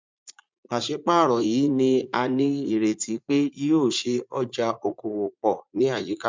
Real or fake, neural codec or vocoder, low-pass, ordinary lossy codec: fake; vocoder, 44.1 kHz, 80 mel bands, Vocos; 7.2 kHz; MP3, 64 kbps